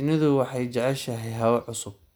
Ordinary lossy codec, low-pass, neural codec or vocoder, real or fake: none; none; none; real